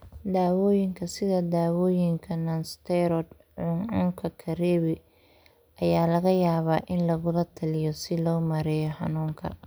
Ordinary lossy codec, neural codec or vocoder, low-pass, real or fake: none; none; none; real